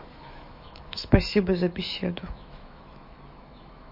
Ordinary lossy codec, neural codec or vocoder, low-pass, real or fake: MP3, 32 kbps; autoencoder, 48 kHz, 128 numbers a frame, DAC-VAE, trained on Japanese speech; 5.4 kHz; fake